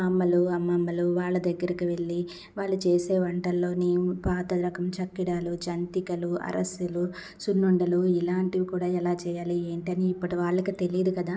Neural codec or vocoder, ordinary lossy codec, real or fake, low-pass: none; none; real; none